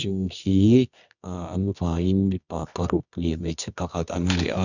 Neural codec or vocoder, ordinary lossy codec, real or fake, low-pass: codec, 24 kHz, 0.9 kbps, WavTokenizer, medium music audio release; none; fake; 7.2 kHz